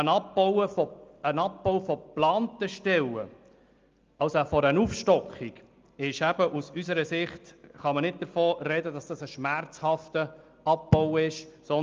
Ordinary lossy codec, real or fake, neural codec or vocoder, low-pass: Opus, 32 kbps; real; none; 7.2 kHz